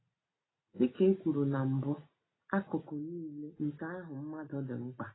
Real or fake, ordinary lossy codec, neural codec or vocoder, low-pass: real; AAC, 16 kbps; none; 7.2 kHz